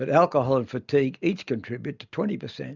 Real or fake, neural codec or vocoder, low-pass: real; none; 7.2 kHz